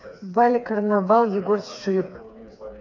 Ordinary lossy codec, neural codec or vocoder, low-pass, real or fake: AAC, 48 kbps; codec, 16 kHz, 4 kbps, FreqCodec, smaller model; 7.2 kHz; fake